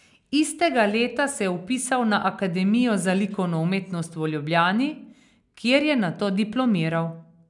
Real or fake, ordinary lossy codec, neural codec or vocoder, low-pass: real; none; none; 10.8 kHz